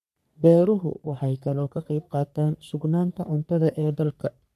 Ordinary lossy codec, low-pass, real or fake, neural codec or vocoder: none; 14.4 kHz; fake; codec, 44.1 kHz, 3.4 kbps, Pupu-Codec